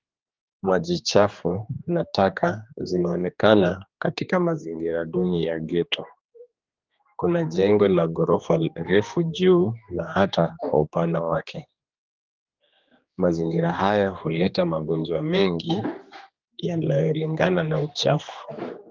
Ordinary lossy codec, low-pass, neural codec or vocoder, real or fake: Opus, 32 kbps; 7.2 kHz; codec, 16 kHz, 2 kbps, X-Codec, HuBERT features, trained on general audio; fake